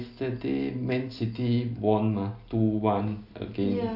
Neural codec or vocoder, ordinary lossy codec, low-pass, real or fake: none; none; 5.4 kHz; real